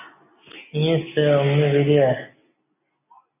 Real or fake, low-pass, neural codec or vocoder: real; 3.6 kHz; none